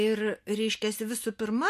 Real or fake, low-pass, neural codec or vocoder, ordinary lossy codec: real; 14.4 kHz; none; MP3, 64 kbps